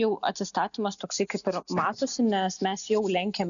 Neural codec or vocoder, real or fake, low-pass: none; real; 7.2 kHz